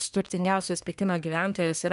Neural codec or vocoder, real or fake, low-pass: codec, 24 kHz, 1 kbps, SNAC; fake; 10.8 kHz